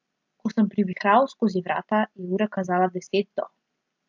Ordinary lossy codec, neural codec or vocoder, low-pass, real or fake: MP3, 64 kbps; none; 7.2 kHz; real